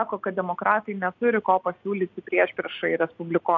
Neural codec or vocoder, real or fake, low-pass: none; real; 7.2 kHz